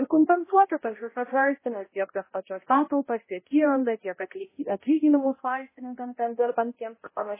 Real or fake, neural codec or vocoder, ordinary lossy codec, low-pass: fake; codec, 16 kHz, 0.5 kbps, X-Codec, HuBERT features, trained on balanced general audio; MP3, 16 kbps; 3.6 kHz